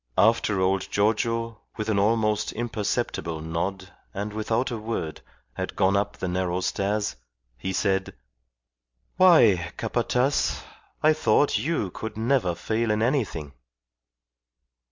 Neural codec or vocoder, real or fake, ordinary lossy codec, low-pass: none; real; MP3, 64 kbps; 7.2 kHz